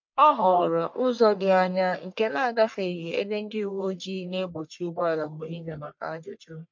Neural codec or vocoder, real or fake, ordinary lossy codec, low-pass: codec, 44.1 kHz, 1.7 kbps, Pupu-Codec; fake; MP3, 48 kbps; 7.2 kHz